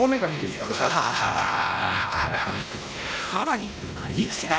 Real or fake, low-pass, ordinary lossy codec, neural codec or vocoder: fake; none; none; codec, 16 kHz, 1 kbps, X-Codec, WavLM features, trained on Multilingual LibriSpeech